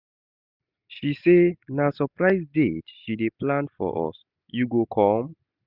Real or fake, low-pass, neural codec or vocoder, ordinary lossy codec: real; 5.4 kHz; none; none